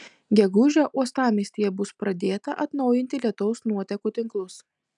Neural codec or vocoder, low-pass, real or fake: none; 10.8 kHz; real